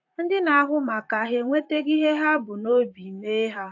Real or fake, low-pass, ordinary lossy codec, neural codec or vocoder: fake; 7.2 kHz; AAC, 48 kbps; vocoder, 44.1 kHz, 128 mel bands, Pupu-Vocoder